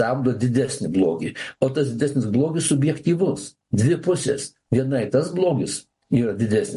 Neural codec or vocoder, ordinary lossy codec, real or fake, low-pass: none; MP3, 48 kbps; real; 14.4 kHz